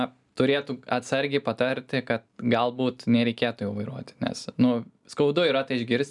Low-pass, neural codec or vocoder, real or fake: 10.8 kHz; none; real